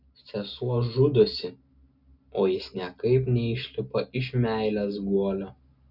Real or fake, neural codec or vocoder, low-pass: real; none; 5.4 kHz